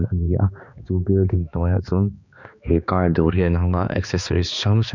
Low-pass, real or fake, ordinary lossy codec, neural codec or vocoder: 7.2 kHz; fake; none; codec, 16 kHz, 2 kbps, X-Codec, HuBERT features, trained on balanced general audio